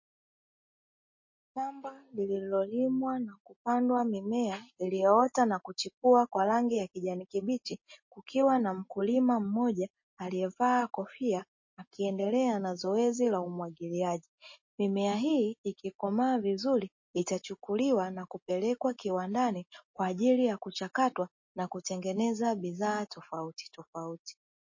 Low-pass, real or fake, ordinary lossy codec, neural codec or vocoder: 7.2 kHz; real; MP3, 32 kbps; none